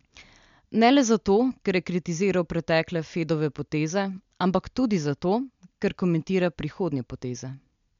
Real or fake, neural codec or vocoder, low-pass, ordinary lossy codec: real; none; 7.2 kHz; MP3, 64 kbps